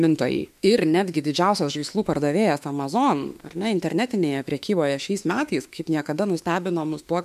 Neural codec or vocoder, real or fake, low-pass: autoencoder, 48 kHz, 32 numbers a frame, DAC-VAE, trained on Japanese speech; fake; 14.4 kHz